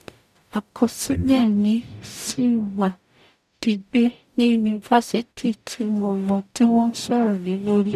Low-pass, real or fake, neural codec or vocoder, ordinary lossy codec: 14.4 kHz; fake; codec, 44.1 kHz, 0.9 kbps, DAC; none